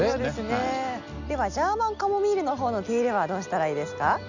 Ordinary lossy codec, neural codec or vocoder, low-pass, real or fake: none; none; 7.2 kHz; real